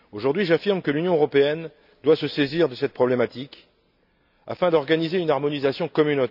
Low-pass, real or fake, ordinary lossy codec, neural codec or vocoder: 5.4 kHz; real; none; none